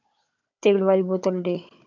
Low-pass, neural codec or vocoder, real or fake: 7.2 kHz; codec, 16 kHz, 16 kbps, FunCodec, trained on Chinese and English, 50 frames a second; fake